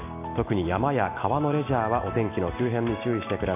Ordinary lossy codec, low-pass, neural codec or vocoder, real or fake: AAC, 24 kbps; 3.6 kHz; none; real